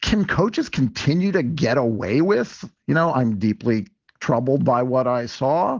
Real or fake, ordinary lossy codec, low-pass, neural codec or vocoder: real; Opus, 16 kbps; 7.2 kHz; none